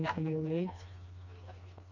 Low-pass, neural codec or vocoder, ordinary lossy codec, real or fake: 7.2 kHz; codec, 16 kHz, 2 kbps, FreqCodec, smaller model; none; fake